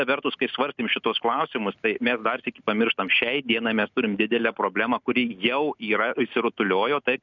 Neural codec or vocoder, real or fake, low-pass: none; real; 7.2 kHz